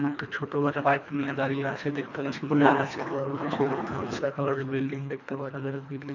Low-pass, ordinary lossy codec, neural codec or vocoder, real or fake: 7.2 kHz; none; codec, 24 kHz, 1.5 kbps, HILCodec; fake